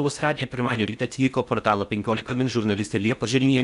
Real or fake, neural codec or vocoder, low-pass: fake; codec, 16 kHz in and 24 kHz out, 0.6 kbps, FocalCodec, streaming, 4096 codes; 10.8 kHz